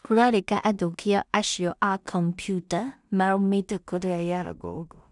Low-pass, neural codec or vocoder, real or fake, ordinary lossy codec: 10.8 kHz; codec, 16 kHz in and 24 kHz out, 0.4 kbps, LongCat-Audio-Codec, two codebook decoder; fake; none